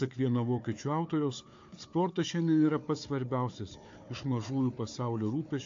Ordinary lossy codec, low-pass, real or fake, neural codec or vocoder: AAC, 48 kbps; 7.2 kHz; fake; codec, 16 kHz, 4 kbps, FunCodec, trained on Chinese and English, 50 frames a second